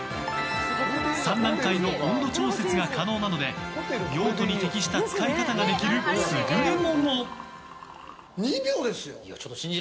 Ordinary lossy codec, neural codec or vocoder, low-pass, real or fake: none; none; none; real